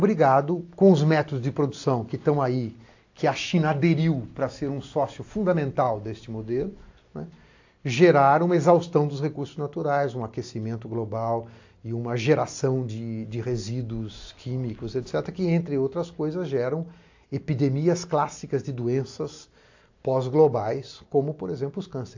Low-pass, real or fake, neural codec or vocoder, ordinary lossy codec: 7.2 kHz; real; none; AAC, 48 kbps